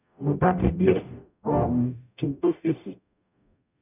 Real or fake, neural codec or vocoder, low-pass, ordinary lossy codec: fake; codec, 44.1 kHz, 0.9 kbps, DAC; 3.6 kHz; none